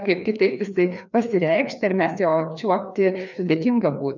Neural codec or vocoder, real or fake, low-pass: codec, 16 kHz, 2 kbps, FreqCodec, larger model; fake; 7.2 kHz